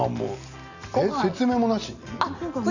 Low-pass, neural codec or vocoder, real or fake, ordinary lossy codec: 7.2 kHz; none; real; none